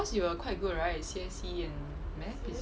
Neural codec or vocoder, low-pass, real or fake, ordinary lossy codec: none; none; real; none